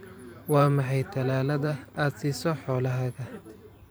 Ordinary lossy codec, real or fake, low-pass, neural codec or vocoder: none; fake; none; vocoder, 44.1 kHz, 128 mel bands every 512 samples, BigVGAN v2